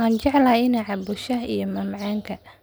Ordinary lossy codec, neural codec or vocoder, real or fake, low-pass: none; none; real; none